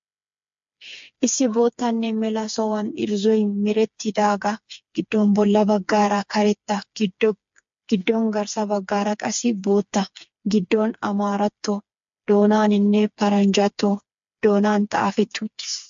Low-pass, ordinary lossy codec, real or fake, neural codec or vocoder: 7.2 kHz; MP3, 48 kbps; fake; codec, 16 kHz, 4 kbps, FreqCodec, smaller model